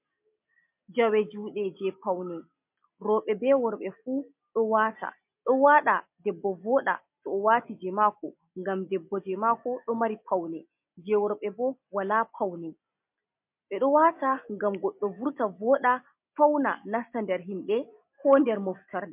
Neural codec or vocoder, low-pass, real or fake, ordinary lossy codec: none; 3.6 kHz; real; MP3, 32 kbps